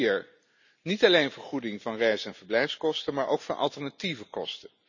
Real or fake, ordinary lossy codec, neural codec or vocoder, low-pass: real; none; none; 7.2 kHz